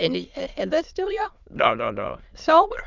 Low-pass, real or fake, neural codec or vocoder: 7.2 kHz; fake; autoencoder, 22.05 kHz, a latent of 192 numbers a frame, VITS, trained on many speakers